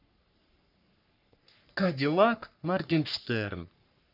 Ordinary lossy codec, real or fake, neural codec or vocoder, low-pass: none; fake; codec, 44.1 kHz, 3.4 kbps, Pupu-Codec; 5.4 kHz